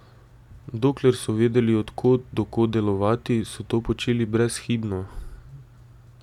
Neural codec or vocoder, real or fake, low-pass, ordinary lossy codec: none; real; 19.8 kHz; none